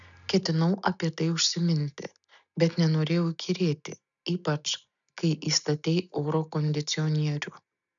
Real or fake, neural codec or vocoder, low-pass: real; none; 7.2 kHz